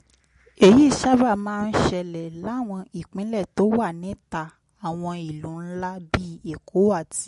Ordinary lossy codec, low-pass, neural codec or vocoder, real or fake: MP3, 48 kbps; 10.8 kHz; none; real